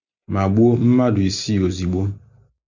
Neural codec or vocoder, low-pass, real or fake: none; 7.2 kHz; real